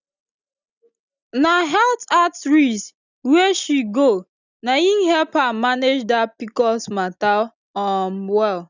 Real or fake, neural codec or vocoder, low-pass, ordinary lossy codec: real; none; 7.2 kHz; none